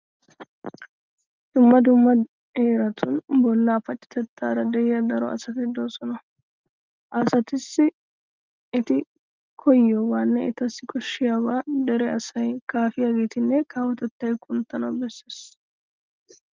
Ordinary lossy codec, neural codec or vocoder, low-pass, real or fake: Opus, 24 kbps; none; 7.2 kHz; real